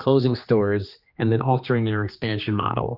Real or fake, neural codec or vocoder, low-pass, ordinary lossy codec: fake; codec, 16 kHz, 2 kbps, X-Codec, HuBERT features, trained on general audio; 5.4 kHz; Opus, 64 kbps